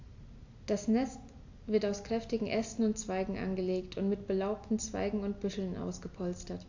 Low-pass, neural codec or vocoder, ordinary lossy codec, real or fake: 7.2 kHz; none; AAC, 48 kbps; real